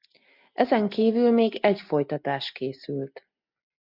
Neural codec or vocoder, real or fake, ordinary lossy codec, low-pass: none; real; MP3, 48 kbps; 5.4 kHz